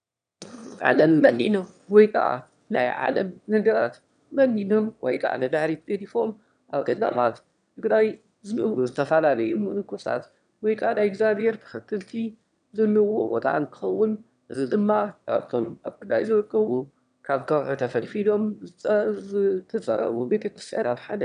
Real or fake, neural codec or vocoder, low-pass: fake; autoencoder, 22.05 kHz, a latent of 192 numbers a frame, VITS, trained on one speaker; 9.9 kHz